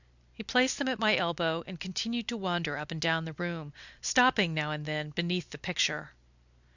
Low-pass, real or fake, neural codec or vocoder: 7.2 kHz; real; none